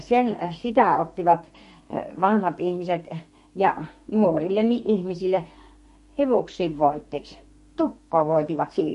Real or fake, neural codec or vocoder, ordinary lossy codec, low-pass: fake; codec, 32 kHz, 1.9 kbps, SNAC; MP3, 48 kbps; 14.4 kHz